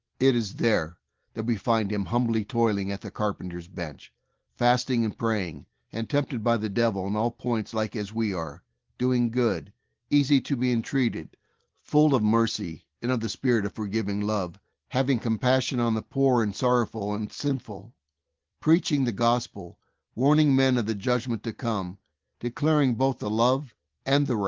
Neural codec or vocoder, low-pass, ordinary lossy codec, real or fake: none; 7.2 kHz; Opus, 16 kbps; real